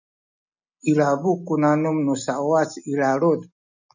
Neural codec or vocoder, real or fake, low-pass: none; real; 7.2 kHz